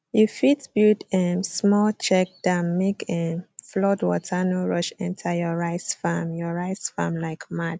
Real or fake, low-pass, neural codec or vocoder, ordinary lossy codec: real; none; none; none